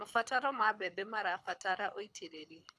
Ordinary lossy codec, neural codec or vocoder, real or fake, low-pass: none; codec, 24 kHz, 6 kbps, HILCodec; fake; none